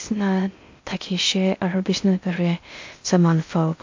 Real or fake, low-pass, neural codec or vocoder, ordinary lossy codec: fake; 7.2 kHz; codec, 16 kHz in and 24 kHz out, 0.6 kbps, FocalCodec, streaming, 2048 codes; MP3, 48 kbps